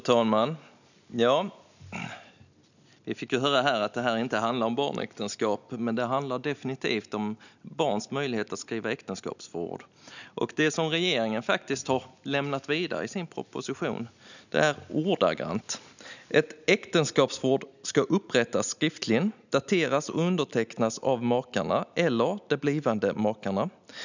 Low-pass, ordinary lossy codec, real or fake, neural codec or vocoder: 7.2 kHz; none; real; none